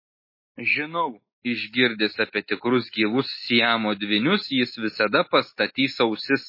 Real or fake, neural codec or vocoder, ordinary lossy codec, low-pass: real; none; MP3, 24 kbps; 5.4 kHz